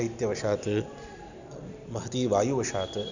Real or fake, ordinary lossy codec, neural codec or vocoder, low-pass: real; none; none; 7.2 kHz